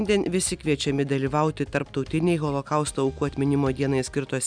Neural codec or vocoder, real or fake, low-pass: none; real; 9.9 kHz